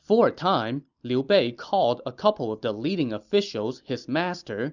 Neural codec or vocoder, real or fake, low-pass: none; real; 7.2 kHz